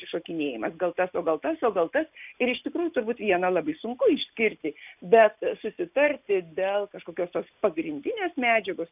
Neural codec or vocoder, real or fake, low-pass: none; real; 3.6 kHz